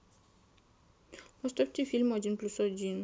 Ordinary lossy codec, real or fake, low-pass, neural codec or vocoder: none; real; none; none